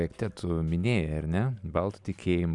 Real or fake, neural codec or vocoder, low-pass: real; none; 10.8 kHz